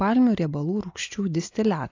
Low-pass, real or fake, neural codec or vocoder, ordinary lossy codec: 7.2 kHz; real; none; AAC, 48 kbps